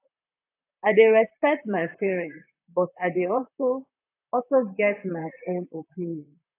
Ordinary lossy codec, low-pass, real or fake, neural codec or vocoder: AAC, 24 kbps; 3.6 kHz; fake; vocoder, 44.1 kHz, 128 mel bands, Pupu-Vocoder